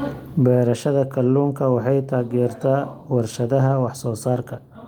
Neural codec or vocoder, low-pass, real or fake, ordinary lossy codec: none; 19.8 kHz; real; Opus, 24 kbps